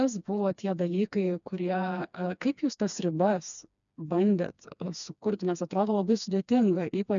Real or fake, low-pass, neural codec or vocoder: fake; 7.2 kHz; codec, 16 kHz, 2 kbps, FreqCodec, smaller model